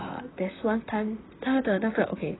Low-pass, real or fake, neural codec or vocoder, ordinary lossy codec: 7.2 kHz; fake; vocoder, 44.1 kHz, 128 mel bands, Pupu-Vocoder; AAC, 16 kbps